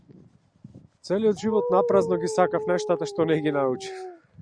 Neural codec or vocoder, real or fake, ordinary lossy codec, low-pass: none; real; Opus, 64 kbps; 9.9 kHz